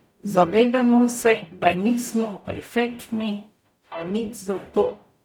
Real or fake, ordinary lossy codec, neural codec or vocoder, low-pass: fake; none; codec, 44.1 kHz, 0.9 kbps, DAC; none